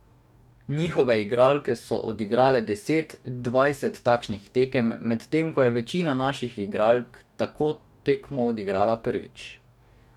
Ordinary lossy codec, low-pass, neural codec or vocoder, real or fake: none; 19.8 kHz; codec, 44.1 kHz, 2.6 kbps, DAC; fake